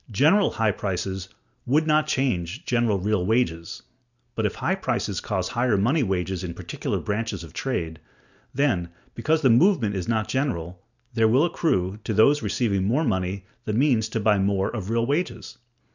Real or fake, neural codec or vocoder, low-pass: real; none; 7.2 kHz